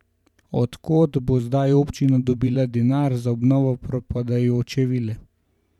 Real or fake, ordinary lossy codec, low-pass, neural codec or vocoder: fake; none; 19.8 kHz; vocoder, 44.1 kHz, 128 mel bands every 512 samples, BigVGAN v2